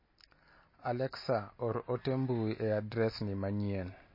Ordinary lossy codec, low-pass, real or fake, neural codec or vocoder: MP3, 24 kbps; 5.4 kHz; real; none